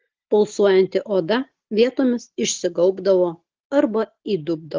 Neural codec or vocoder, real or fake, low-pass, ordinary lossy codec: none; real; 7.2 kHz; Opus, 16 kbps